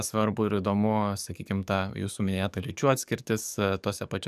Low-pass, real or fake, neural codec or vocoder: 14.4 kHz; fake; codec, 44.1 kHz, 7.8 kbps, DAC